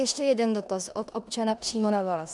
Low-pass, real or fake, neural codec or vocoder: 10.8 kHz; fake; codec, 16 kHz in and 24 kHz out, 0.9 kbps, LongCat-Audio-Codec, four codebook decoder